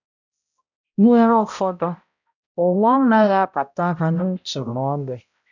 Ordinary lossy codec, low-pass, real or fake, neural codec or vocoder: none; 7.2 kHz; fake; codec, 16 kHz, 0.5 kbps, X-Codec, HuBERT features, trained on balanced general audio